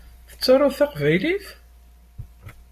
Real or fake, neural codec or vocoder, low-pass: real; none; 14.4 kHz